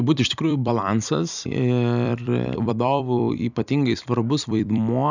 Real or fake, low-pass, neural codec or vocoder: fake; 7.2 kHz; vocoder, 44.1 kHz, 128 mel bands every 256 samples, BigVGAN v2